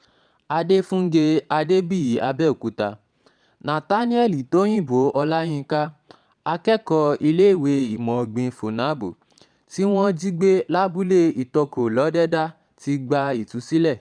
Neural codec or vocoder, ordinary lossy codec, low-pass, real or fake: vocoder, 22.05 kHz, 80 mel bands, Vocos; none; 9.9 kHz; fake